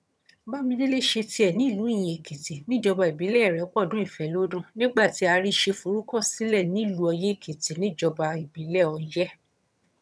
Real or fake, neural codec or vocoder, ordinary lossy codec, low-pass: fake; vocoder, 22.05 kHz, 80 mel bands, HiFi-GAN; none; none